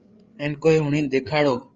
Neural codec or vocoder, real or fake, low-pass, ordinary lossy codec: codec, 16 kHz, 8 kbps, FreqCodec, larger model; fake; 7.2 kHz; Opus, 24 kbps